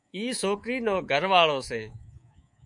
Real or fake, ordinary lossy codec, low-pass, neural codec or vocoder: fake; MP3, 64 kbps; 10.8 kHz; codec, 24 kHz, 3.1 kbps, DualCodec